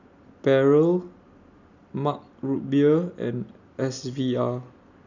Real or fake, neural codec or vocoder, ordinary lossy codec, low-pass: real; none; none; 7.2 kHz